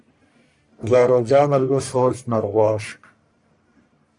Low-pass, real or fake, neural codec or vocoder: 10.8 kHz; fake; codec, 44.1 kHz, 1.7 kbps, Pupu-Codec